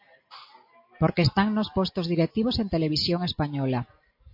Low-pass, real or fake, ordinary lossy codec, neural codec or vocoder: 5.4 kHz; real; MP3, 32 kbps; none